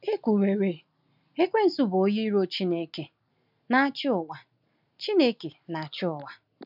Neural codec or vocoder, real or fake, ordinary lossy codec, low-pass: none; real; none; 5.4 kHz